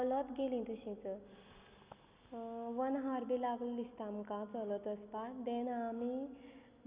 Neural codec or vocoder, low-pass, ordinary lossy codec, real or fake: none; 3.6 kHz; Opus, 64 kbps; real